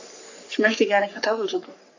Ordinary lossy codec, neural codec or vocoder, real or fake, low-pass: AAC, 48 kbps; codec, 44.1 kHz, 3.4 kbps, Pupu-Codec; fake; 7.2 kHz